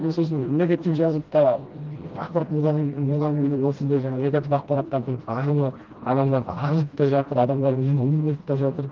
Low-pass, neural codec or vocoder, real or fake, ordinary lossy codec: 7.2 kHz; codec, 16 kHz, 1 kbps, FreqCodec, smaller model; fake; Opus, 32 kbps